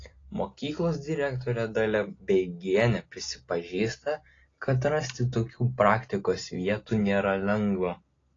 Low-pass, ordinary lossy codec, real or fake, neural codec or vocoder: 7.2 kHz; AAC, 32 kbps; real; none